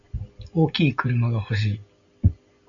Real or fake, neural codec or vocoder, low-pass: real; none; 7.2 kHz